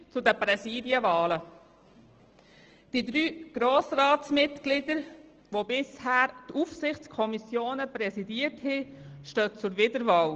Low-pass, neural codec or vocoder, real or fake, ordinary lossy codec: 7.2 kHz; none; real; Opus, 16 kbps